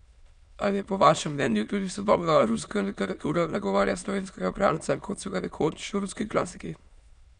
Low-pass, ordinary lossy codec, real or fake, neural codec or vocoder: 9.9 kHz; none; fake; autoencoder, 22.05 kHz, a latent of 192 numbers a frame, VITS, trained on many speakers